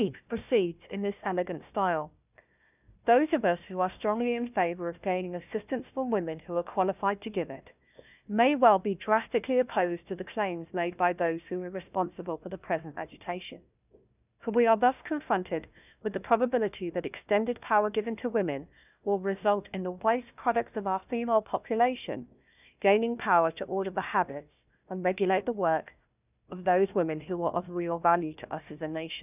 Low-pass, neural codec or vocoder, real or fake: 3.6 kHz; codec, 16 kHz, 1 kbps, FunCodec, trained on Chinese and English, 50 frames a second; fake